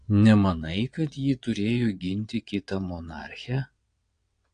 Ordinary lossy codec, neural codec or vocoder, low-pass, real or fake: AAC, 48 kbps; vocoder, 22.05 kHz, 80 mel bands, WaveNeXt; 9.9 kHz; fake